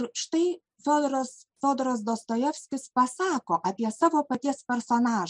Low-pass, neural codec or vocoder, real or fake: 10.8 kHz; none; real